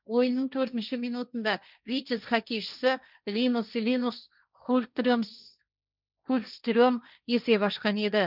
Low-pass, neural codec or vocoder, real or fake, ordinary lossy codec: 5.4 kHz; codec, 16 kHz, 1.1 kbps, Voila-Tokenizer; fake; none